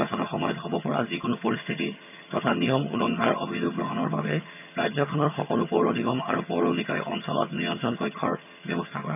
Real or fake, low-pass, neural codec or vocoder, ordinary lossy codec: fake; 3.6 kHz; vocoder, 22.05 kHz, 80 mel bands, HiFi-GAN; none